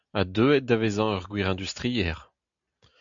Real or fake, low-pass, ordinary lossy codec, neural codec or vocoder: real; 7.2 kHz; AAC, 64 kbps; none